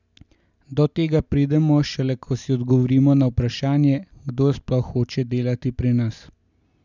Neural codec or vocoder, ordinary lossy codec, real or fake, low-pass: none; none; real; 7.2 kHz